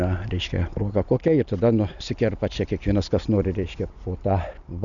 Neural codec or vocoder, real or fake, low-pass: none; real; 7.2 kHz